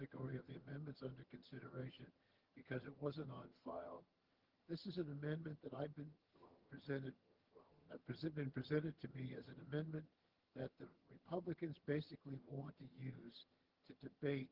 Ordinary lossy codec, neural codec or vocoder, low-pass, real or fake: Opus, 16 kbps; vocoder, 22.05 kHz, 80 mel bands, HiFi-GAN; 5.4 kHz; fake